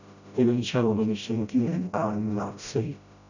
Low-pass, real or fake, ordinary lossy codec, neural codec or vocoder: 7.2 kHz; fake; none; codec, 16 kHz, 0.5 kbps, FreqCodec, smaller model